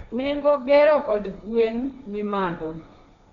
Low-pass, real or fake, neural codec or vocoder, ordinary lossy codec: 7.2 kHz; fake; codec, 16 kHz, 1.1 kbps, Voila-Tokenizer; none